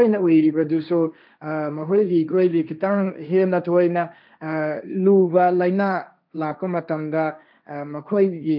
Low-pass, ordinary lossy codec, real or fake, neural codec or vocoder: 5.4 kHz; none; fake; codec, 16 kHz, 1.1 kbps, Voila-Tokenizer